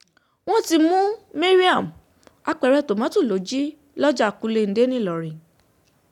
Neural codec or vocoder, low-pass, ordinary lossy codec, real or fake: none; 19.8 kHz; none; real